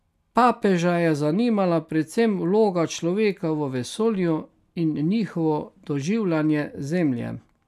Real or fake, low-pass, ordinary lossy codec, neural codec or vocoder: real; 14.4 kHz; none; none